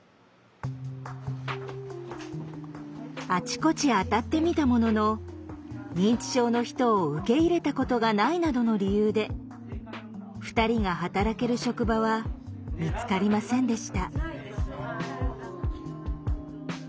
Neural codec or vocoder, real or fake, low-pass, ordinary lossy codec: none; real; none; none